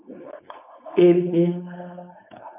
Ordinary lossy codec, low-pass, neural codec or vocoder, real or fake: AAC, 32 kbps; 3.6 kHz; codec, 16 kHz, 4.8 kbps, FACodec; fake